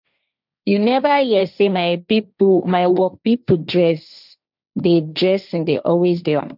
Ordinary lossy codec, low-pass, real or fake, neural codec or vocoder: none; 5.4 kHz; fake; codec, 16 kHz, 1.1 kbps, Voila-Tokenizer